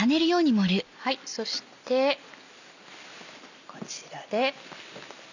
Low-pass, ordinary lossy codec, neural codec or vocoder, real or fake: 7.2 kHz; none; none; real